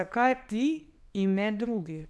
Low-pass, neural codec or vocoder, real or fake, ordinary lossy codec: none; codec, 24 kHz, 0.9 kbps, WavTokenizer, small release; fake; none